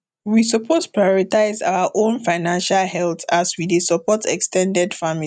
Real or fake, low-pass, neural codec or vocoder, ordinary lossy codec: real; 9.9 kHz; none; none